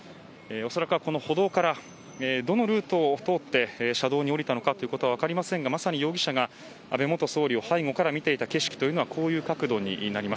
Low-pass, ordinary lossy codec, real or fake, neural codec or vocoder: none; none; real; none